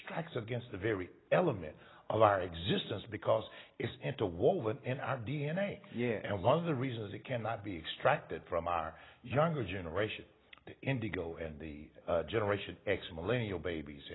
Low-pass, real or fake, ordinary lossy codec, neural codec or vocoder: 7.2 kHz; real; AAC, 16 kbps; none